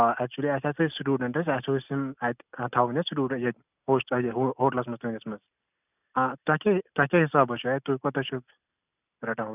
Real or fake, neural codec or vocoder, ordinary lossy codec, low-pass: real; none; none; 3.6 kHz